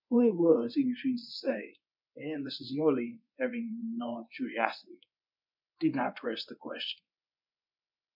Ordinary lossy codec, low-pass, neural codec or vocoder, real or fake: MP3, 48 kbps; 5.4 kHz; codec, 24 kHz, 0.9 kbps, WavTokenizer, medium speech release version 1; fake